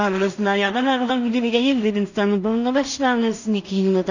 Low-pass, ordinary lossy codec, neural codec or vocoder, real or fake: 7.2 kHz; none; codec, 16 kHz in and 24 kHz out, 0.4 kbps, LongCat-Audio-Codec, two codebook decoder; fake